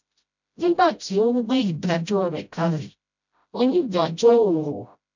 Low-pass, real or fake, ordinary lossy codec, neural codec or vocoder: 7.2 kHz; fake; none; codec, 16 kHz, 0.5 kbps, FreqCodec, smaller model